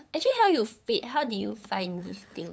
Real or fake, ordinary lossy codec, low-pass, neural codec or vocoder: fake; none; none; codec, 16 kHz, 8 kbps, FunCodec, trained on LibriTTS, 25 frames a second